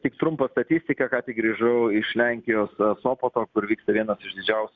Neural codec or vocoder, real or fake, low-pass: none; real; 7.2 kHz